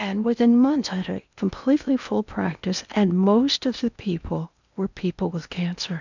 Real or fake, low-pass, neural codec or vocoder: fake; 7.2 kHz; codec, 16 kHz in and 24 kHz out, 0.8 kbps, FocalCodec, streaming, 65536 codes